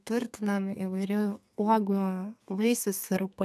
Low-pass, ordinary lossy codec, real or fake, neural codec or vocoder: 14.4 kHz; AAC, 96 kbps; fake; codec, 32 kHz, 1.9 kbps, SNAC